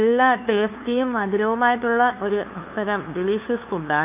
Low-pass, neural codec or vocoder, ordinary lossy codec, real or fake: 3.6 kHz; codec, 16 kHz, 2 kbps, FunCodec, trained on LibriTTS, 25 frames a second; none; fake